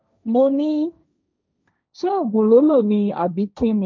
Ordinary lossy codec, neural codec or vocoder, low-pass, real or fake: none; codec, 16 kHz, 1.1 kbps, Voila-Tokenizer; none; fake